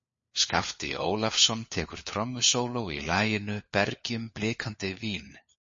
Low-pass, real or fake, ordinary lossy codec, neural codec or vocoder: 7.2 kHz; fake; MP3, 32 kbps; codec, 16 kHz, 4 kbps, FunCodec, trained on LibriTTS, 50 frames a second